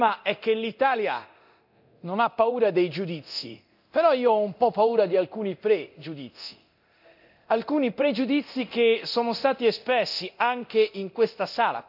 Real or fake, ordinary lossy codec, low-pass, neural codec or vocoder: fake; none; 5.4 kHz; codec, 24 kHz, 0.9 kbps, DualCodec